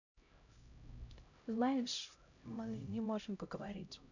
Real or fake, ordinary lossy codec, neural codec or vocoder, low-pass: fake; none; codec, 16 kHz, 0.5 kbps, X-Codec, HuBERT features, trained on LibriSpeech; 7.2 kHz